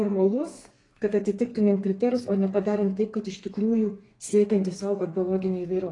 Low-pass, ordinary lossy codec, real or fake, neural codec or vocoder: 10.8 kHz; AAC, 32 kbps; fake; codec, 32 kHz, 1.9 kbps, SNAC